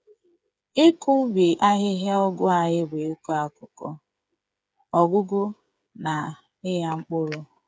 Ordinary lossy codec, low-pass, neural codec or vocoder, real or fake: none; none; codec, 16 kHz, 8 kbps, FreqCodec, smaller model; fake